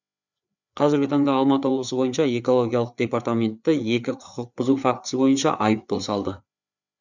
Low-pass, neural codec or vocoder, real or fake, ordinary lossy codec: 7.2 kHz; codec, 16 kHz, 4 kbps, FreqCodec, larger model; fake; none